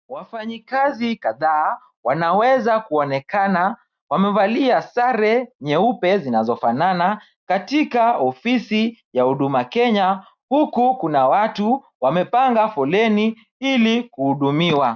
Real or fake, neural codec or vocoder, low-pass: real; none; 7.2 kHz